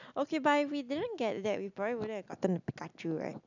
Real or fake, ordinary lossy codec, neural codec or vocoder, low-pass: real; none; none; 7.2 kHz